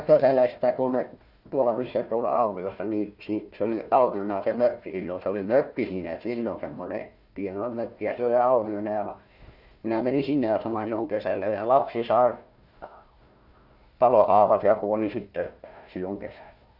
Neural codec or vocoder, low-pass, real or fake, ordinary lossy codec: codec, 16 kHz, 1 kbps, FunCodec, trained on Chinese and English, 50 frames a second; 5.4 kHz; fake; none